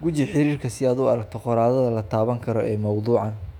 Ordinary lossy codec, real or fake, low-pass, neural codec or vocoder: none; fake; 19.8 kHz; autoencoder, 48 kHz, 128 numbers a frame, DAC-VAE, trained on Japanese speech